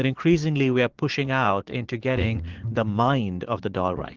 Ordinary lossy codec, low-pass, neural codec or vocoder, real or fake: Opus, 16 kbps; 7.2 kHz; vocoder, 44.1 kHz, 80 mel bands, Vocos; fake